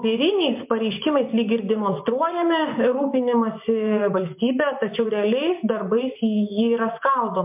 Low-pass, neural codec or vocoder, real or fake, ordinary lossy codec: 3.6 kHz; vocoder, 24 kHz, 100 mel bands, Vocos; fake; MP3, 32 kbps